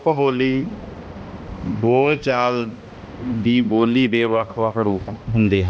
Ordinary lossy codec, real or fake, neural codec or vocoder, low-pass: none; fake; codec, 16 kHz, 1 kbps, X-Codec, HuBERT features, trained on balanced general audio; none